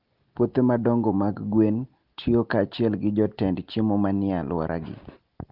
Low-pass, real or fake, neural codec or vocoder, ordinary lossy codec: 5.4 kHz; real; none; Opus, 32 kbps